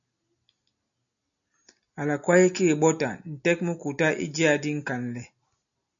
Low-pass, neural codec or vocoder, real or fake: 7.2 kHz; none; real